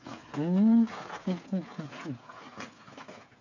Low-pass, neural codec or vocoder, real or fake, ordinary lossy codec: 7.2 kHz; codec, 16 kHz, 8 kbps, FreqCodec, smaller model; fake; none